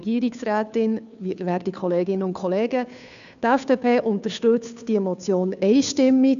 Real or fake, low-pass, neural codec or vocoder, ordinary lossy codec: fake; 7.2 kHz; codec, 16 kHz, 2 kbps, FunCodec, trained on Chinese and English, 25 frames a second; none